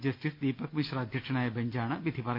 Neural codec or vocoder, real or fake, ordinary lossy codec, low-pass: none; real; none; 5.4 kHz